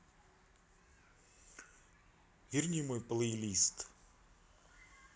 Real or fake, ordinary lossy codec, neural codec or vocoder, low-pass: real; none; none; none